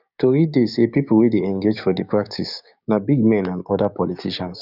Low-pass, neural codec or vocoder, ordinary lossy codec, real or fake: 5.4 kHz; codec, 16 kHz, 6 kbps, DAC; none; fake